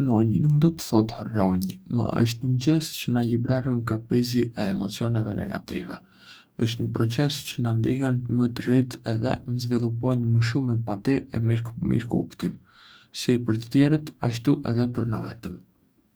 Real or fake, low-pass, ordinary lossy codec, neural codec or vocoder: fake; none; none; codec, 44.1 kHz, 2.6 kbps, DAC